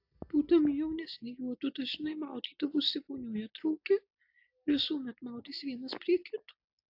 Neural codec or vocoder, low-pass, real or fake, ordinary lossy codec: none; 5.4 kHz; real; AAC, 48 kbps